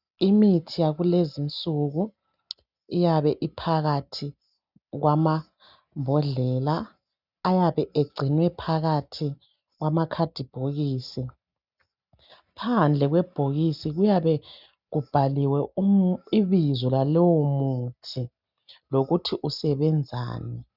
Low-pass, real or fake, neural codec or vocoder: 5.4 kHz; real; none